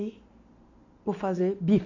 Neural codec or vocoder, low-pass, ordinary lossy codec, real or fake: none; 7.2 kHz; none; real